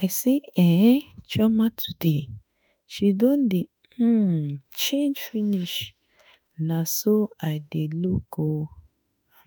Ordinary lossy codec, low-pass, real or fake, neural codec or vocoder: none; none; fake; autoencoder, 48 kHz, 32 numbers a frame, DAC-VAE, trained on Japanese speech